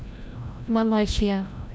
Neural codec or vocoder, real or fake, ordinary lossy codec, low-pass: codec, 16 kHz, 0.5 kbps, FreqCodec, larger model; fake; none; none